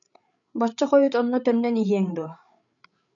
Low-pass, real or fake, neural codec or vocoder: 7.2 kHz; fake; codec, 16 kHz, 8 kbps, FreqCodec, larger model